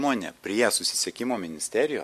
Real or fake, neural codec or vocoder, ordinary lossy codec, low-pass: real; none; MP3, 64 kbps; 14.4 kHz